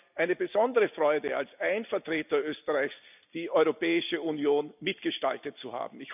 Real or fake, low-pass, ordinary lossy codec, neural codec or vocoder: real; 3.6 kHz; none; none